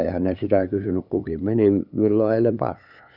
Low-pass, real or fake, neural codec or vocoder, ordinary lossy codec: 5.4 kHz; fake; codec, 16 kHz, 8 kbps, FunCodec, trained on LibriTTS, 25 frames a second; none